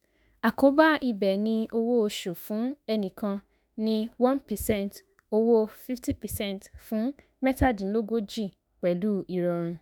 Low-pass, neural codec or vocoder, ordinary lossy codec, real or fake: none; autoencoder, 48 kHz, 32 numbers a frame, DAC-VAE, trained on Japanese speech; none; fake